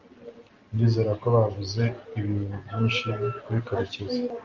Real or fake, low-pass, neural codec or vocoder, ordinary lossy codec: real; 7.2 kHz; none; Opus, 24 kbps